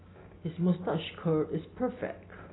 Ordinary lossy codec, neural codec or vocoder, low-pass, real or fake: AAC, 16 kbps; none; 7.2 kHz; real